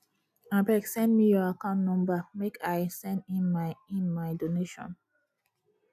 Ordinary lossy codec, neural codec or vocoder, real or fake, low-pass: none; none; real; 14.4 kHz